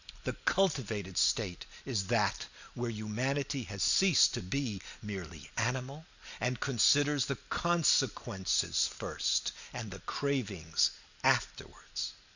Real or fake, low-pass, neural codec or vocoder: real; 7.2 kHz; none